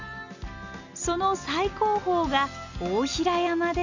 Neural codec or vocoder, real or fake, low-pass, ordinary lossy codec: none; real; 7.2 kHz; none